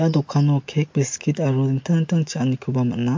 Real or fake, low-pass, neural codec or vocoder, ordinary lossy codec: real; 7.2 kHz; none; MP3, 48 kbps